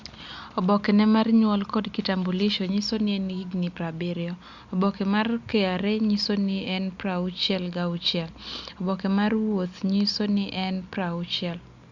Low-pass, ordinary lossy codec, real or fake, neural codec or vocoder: 7.2 kHz; Opus, 64 kbps; real; none